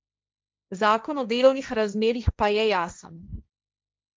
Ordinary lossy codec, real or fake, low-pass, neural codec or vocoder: none; fake; none; codec, 16 kHz, 1.1 kbps, Voila-Tokenizer